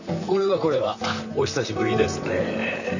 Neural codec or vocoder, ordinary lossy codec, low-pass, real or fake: vocoder, 44.1 kHz, 128 mel bands, Pupu-Vocoder; none; 7.2 kHz; fake